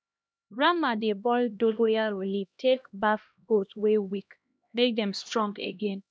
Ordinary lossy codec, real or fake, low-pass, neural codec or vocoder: none; fake; none; codec, 16 kHz, 1 kbps, X-Codec, HuBERT features, trained on LibriSpeech